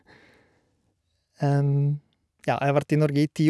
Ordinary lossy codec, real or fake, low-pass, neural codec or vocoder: none; real; none; none